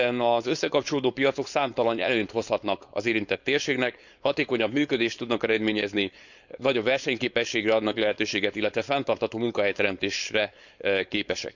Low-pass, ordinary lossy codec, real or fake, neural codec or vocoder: 7.2 kHz; none; fake; codec, 16 kHz, 4.8 kbps, FACodec